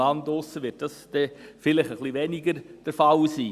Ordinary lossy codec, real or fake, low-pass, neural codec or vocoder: Opus, 64 kbps; real; 14.4 kHz; none